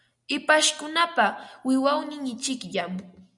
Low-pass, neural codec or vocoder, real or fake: 10.8 kHz; none; real